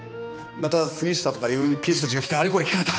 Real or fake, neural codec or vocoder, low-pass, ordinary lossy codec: fake; codec, 16 kHz, 2 kbps, X-Codec, HuBERT features, trained on balanced general audio; none; none